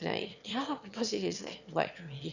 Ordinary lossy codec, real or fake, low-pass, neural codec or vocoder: none; fake; 7.2 kHz; autoencoder, 22.05 kHz, a latent of 192 numbers a frame, VITS, trained on one speaker